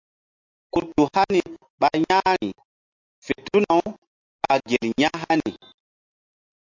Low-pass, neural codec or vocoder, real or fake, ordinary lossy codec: 7.2 kHz; none; real; MP3, 48 kbps